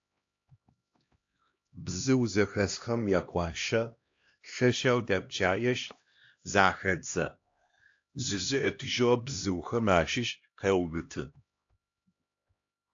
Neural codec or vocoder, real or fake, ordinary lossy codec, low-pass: codec, 16 kHz, 1 kbps, X-Codec, HuBERT features, trained on LibriSpeech; fake; AAC, 48 kbps; 7.2 kHz